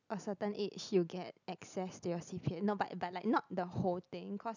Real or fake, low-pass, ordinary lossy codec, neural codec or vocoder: real; 7.2 kHz; none; none